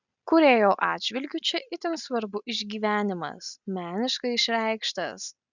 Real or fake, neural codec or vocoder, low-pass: real; none; 7.2 kHz